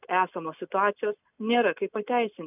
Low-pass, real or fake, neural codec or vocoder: 3.6 kHz; real; none